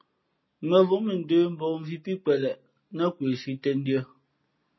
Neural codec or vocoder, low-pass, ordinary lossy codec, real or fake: none; 7.2 kHz; MP3, 24 kbps; real